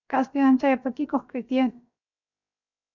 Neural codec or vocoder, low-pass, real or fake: codec, 16 kHz, 0.7 kbps, FocalCodec; 7.2 kHz; fake